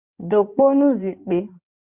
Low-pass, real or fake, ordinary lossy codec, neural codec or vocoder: 3.6 kHz; fake; Opus, 64 kbps; codec, 16 kHz in and 24 kHz out, 2.2 kbps, FireRedTTS-2 codec